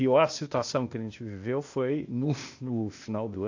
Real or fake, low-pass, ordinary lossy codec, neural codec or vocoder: fake; 7.2 kHz; AAC, 48 kbps; codec, 16 kHz, 0.8 kbps, ZipCodec